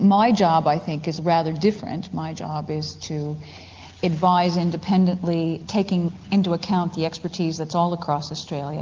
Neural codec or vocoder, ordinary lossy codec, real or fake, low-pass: none; Opus, 32 kbps; real; 7.2 kHz